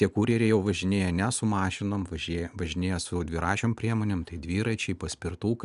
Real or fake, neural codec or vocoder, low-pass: real; none; 10.8 kHz